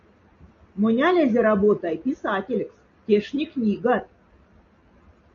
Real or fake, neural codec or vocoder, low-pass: real; none; 7.2 kHz